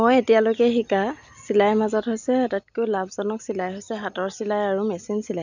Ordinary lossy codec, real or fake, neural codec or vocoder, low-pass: none; real; none; 7.2 kHz